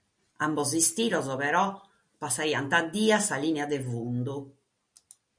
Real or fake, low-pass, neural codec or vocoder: real; 9.9 kHz; none